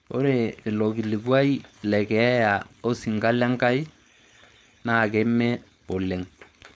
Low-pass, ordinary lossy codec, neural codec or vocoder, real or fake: none; none; codec, 16 kHz, 4.8 kbps, FACodec; fake